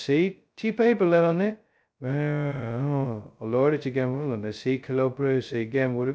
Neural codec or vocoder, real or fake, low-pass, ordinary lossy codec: codec, 16 kHz, 0.2 kbps, FocalCodec; fake; none; none